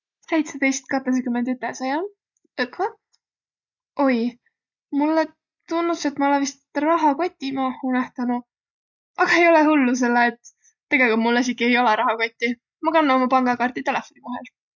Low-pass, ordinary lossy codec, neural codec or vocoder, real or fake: 7.2 kHz; none; none; real